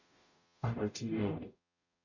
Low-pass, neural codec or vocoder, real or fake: 7.2 kHz; codec, 44.1 kHz, 0.9 kbps, DAC; fake